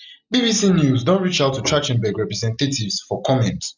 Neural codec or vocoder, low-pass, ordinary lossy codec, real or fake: none; 7.2 kHz; none; real